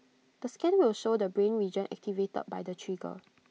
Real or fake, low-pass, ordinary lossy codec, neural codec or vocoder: real; none; none; none